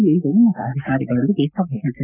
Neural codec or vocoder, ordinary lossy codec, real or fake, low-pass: codec, 44.1 kHz, 7.8 kbps, Pupu-Codec; none; fake; 3.6 kHz